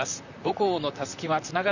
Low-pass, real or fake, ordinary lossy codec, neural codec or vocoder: 7.2 kHz; fake; none; vocoder, 44.1 kHz, 128 mel bands, Pupu-Vocoder